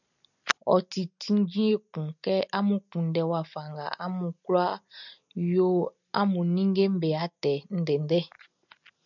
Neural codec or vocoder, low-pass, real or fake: none; 7.2 kHz; real